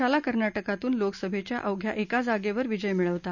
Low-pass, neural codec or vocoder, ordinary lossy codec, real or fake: 7.2 kHz; none; none; real